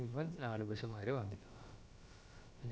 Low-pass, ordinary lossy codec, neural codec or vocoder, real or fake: none; none; codec, 16 kHz, about 1 kbps, DyCAST, with the encoder's durations; fake